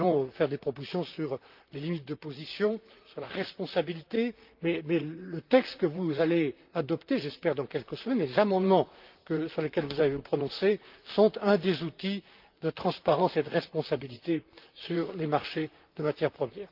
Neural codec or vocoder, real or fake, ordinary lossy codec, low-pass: vocoder, 44.1 kHz, 128 mel bands, Pupu-Vocoder; fake; Opus, 24 kbps; 5.4 kHz